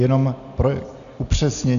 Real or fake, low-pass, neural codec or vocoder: real; 7.2 kHz; none